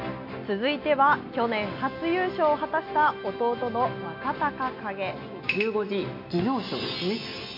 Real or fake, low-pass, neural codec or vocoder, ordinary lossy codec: real; 5.4 kHz; none; MP3, 32 kbps